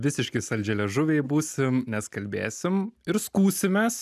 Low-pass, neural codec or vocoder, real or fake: 14.4 kHz; none; real